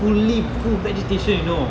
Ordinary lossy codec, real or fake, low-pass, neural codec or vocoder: none; real; none; none